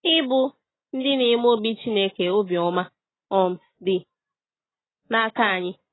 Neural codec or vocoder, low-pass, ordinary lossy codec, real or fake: none; 7.2 kHz; AAC, 16 kbps; real